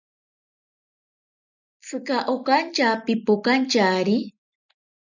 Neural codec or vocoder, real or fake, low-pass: none; real; 7.2 kHz